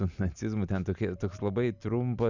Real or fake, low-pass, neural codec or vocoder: real; 7.2 kHz; none